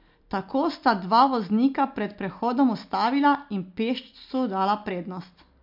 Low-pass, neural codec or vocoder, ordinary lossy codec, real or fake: 5.4 kHz; none; MP3, 48 kbps; real